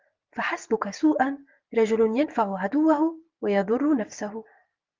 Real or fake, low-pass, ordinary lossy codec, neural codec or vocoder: real; 7.2 kHz; Opus, 32 kbps; none